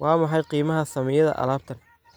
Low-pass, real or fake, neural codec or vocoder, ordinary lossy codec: none; real; none; none